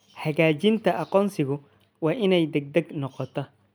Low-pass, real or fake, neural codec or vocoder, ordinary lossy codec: none; real; none; none